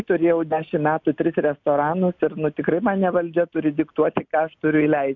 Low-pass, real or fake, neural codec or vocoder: 7.2 kHz; real; none